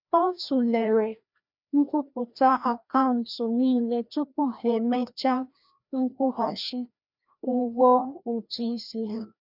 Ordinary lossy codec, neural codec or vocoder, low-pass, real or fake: none; codec, 16 kHz, 1 kbps, FreqCodec, larger model; 5.4 kHz; fake